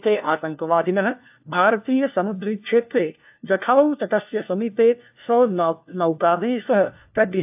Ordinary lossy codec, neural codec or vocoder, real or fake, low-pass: AAC, 32 kbps; codec, 16 kHz, 1 kbps, FunCodec, trained on LibriTTS, 50 frames a second; fake; 3.6 kHz